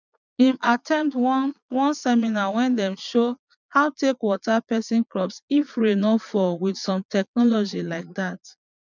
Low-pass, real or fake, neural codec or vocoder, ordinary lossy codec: 7.2 kHz; fake; vocoder, 22.05 kHz, 80 mel bands, Vocos; none